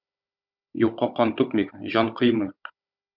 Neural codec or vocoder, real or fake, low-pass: codec, 16 kHz, 16 kbps, FunCodec, trained on Chinese and English, 50 frames a second; fake; 5.4 kHz